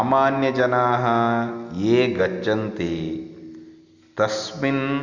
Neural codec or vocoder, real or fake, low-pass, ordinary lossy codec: none; real; 7.2 kHz; Opus, 64 kbps